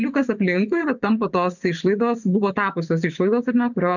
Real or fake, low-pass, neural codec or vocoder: fake; 7.2 kHz; vocoder, 24 kHz, 100 mel bands, Vocos